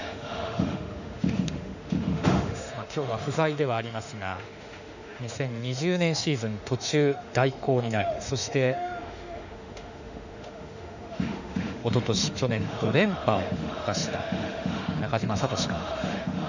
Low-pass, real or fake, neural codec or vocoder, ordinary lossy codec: 7.2 kHz; fake; autoencoder, 48 kHz, 32 numbers a frame, DAC-VAE, trained on Japanese speech; none